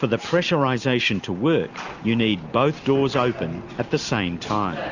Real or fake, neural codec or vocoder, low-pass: real; none; 7.2 kHz